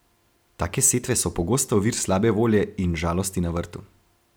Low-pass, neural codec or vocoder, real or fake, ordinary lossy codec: none; none; real; none